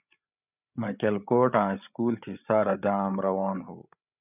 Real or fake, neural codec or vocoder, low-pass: fake; codec, 16 kHz, 8 kbps, FreqCodec, larger model; 3.6 kHz